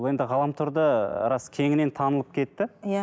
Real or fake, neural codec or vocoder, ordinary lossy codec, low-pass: real; none; none; none